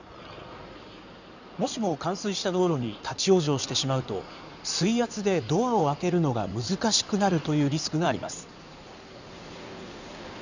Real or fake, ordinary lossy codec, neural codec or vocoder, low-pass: fake; none; codec, 16 kHz in and 24 kHz out, 2.2 kbps, FireRedTTS-2 codec; 7.2 kHz